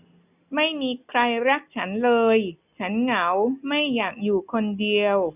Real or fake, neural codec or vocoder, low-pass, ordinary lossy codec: real; none; 3.6 kHz; none